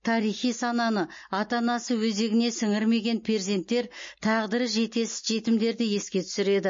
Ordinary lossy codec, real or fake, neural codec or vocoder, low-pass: MP3, 32 kbps; real; none; 7.2 kHz